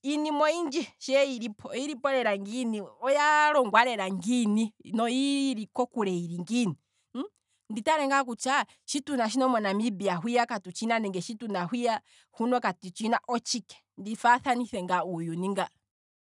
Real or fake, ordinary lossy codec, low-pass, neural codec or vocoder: real; none; 10.8 kHz; none